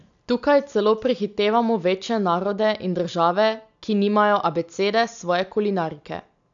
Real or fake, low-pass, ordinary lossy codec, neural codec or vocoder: real; 7.2 kHz; none; none